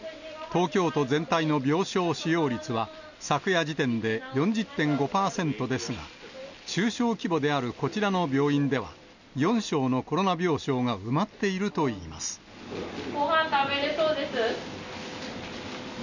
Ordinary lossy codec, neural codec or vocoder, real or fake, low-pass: none; none; real; 7.2 kHz